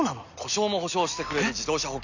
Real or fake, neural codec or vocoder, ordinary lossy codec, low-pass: real; none; none; 7.2 kHz